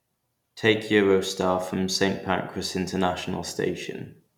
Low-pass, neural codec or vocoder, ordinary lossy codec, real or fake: 19.8 kHz; none; none; real